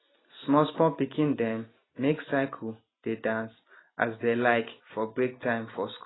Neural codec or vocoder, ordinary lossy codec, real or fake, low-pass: none; AAC, 16 kbps; real; 7.2 kHz